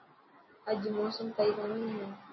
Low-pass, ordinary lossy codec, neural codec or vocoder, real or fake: 7.2 kHz; MP3, 24 kbps; none; real